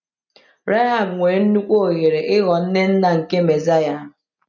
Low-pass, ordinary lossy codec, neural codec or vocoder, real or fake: 7.2 kHz; none; none; real